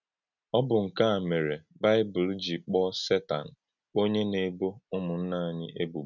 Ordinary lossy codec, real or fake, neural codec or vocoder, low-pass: none; real; none; 7.2 kHz